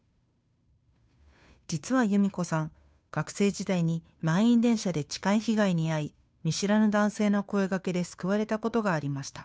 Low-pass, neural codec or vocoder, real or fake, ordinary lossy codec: none; codec, 16 kHz, 2 kbps, FunCodec, trained on Chinese and English, 25 frames a second; fake; none